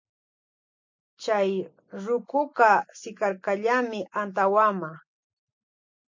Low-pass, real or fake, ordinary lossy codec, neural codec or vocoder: 7.2 kHz; real; MP3, 48 kbps; none